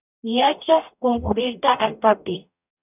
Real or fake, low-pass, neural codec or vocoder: fake; 3.6 kHz; codec, 44.1 kHz, 0.9 kbps, DAC